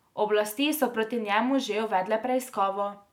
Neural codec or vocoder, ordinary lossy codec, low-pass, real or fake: none; none; 19.8 kHz; real